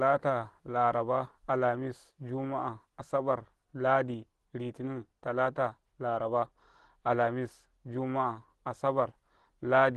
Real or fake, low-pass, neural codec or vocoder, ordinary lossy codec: real; 10.8 kHz; none; Opus, 16 kbps